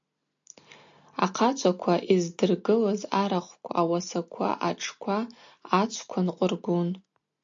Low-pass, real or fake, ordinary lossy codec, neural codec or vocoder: 7.2 kHz; real; AAC, 64 kbps; none